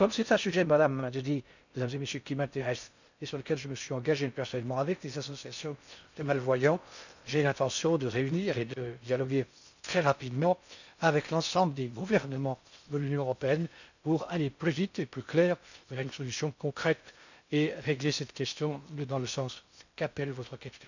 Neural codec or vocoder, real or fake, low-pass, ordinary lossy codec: codec, 16 kHz in and 24 kHz out, 0.6 kbps, FocalCodec, streaming, 4096 codes; fake; 7.2 kHz; none